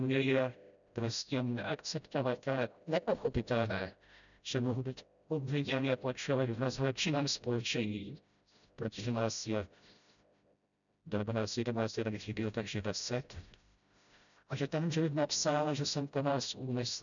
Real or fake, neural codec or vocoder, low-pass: fake; codec, 16 kHz, 0.5 kbps, FreqCodec, smaller model; 7.2 kHz